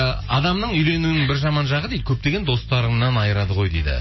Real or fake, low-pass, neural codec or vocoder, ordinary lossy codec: real; 7.2 kHz; none; MP3, 24 kbps